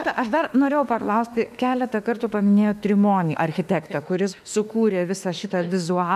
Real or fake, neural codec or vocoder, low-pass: fake; autoencoder, 48 kHz, 32 numbers a frame, DAC-VAE, trained on Japanese speech; 14.4 kHz